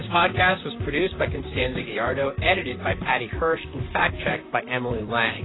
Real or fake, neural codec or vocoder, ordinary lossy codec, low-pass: fake; vocoder, 44.1 kHz, 128 mel bands, Pupu-Vocoder; AAC, 16 kbps; 7.2 kHz